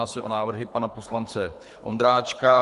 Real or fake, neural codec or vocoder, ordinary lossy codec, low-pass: fake; codec, 24 kHz, 3 kbps, HILCodec; AAC, 96 kbps; 10.8 kHz